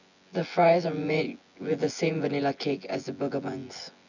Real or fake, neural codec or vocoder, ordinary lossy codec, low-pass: fake; vocoder, 24 kHz, 100 mel bands, Vocos; none; 7.2 kHz